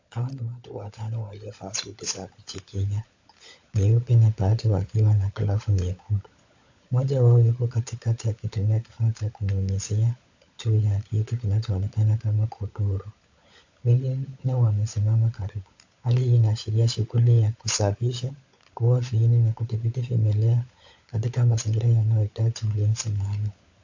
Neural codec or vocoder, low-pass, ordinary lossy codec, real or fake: codec, 16 kHz, 16 kbps, FunCodec, trained on LibriTTS, 50 frames a second; 7.2 kHz; AAC, 48 kbps; fake